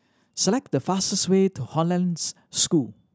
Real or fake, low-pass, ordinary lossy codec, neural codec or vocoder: real; none; none; none